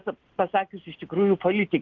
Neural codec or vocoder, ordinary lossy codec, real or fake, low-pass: none; Opus, 16 kbps; real; 7.2 kHz